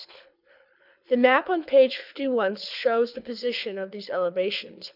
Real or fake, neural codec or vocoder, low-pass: fake; codec, 24 kHz, 6 kbps, HILCodec; 5.4 kHz